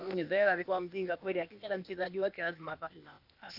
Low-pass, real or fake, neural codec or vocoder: 5.4 kHz; fake; codec, 16 kHz, 0.8 kbps, ZipCodec